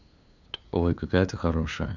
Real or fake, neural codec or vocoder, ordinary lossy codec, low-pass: fake; codec, 16 kHz, 2 kbps, FunCodec, trained on LibriTTS, 25 frames a second; none; 7.2 kHz